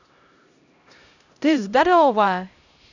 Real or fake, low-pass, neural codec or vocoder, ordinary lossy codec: fake; 7.2 kHz; codec, 16 kHz, 0.5 kbps, X-Codec, HuBERT features, trained on LibriSpeech; none